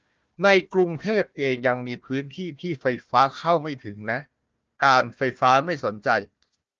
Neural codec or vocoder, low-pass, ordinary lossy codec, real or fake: codec, 16 kHz, 1 kbps, FunCodec, trained on Chinese and English, 50 frames a second; 7.2 kHz; Opus, 24 kbps; fake